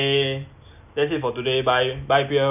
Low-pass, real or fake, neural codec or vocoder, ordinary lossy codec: 3.6 kHz; real; none; none